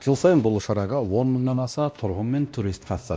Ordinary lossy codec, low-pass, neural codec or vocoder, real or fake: none; none; codec, 16 kHz, 1 kbps, X-Codec, WavLM features, trained on Multilingual LibriSpeech; fake